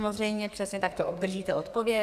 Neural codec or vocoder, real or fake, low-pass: codec, 44.1 kHz, 2.6 kbps, SNAC; fake; 14.4 kHz